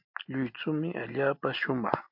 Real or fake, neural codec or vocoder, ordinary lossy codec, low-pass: real; none; AAC, 48 kbps; 5.4 kHz